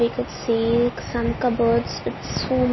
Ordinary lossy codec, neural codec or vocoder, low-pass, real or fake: MP3, 24 kbps; none; 7.2 kHz; real